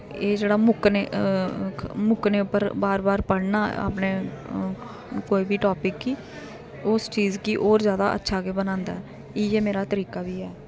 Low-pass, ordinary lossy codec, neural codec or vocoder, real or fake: none; none; none; real